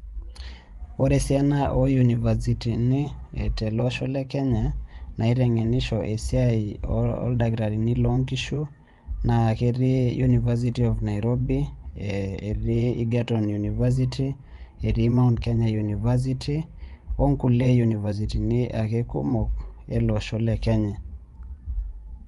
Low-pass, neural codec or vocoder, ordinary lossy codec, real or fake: 10.8 kHz; vocoder, 24 kHz, 100 mel bands, Vocos; Opus, 32 kbps; fake